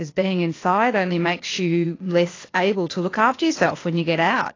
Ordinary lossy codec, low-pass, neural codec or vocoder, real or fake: AAC, 32 kbps; 7.2 kHz; codec, 16 kHz, 0.8 kbps, ZipCodec; fake